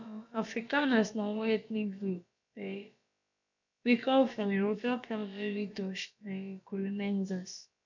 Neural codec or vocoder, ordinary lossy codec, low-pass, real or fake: codec, 16 kHz, about 1 kbps, DyCAST, with the encoder's durations; none; 7.2 kHz; fake